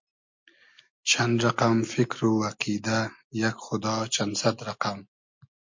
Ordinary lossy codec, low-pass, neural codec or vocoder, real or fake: MP3, 48 kbps; 7.2 kHz; none; real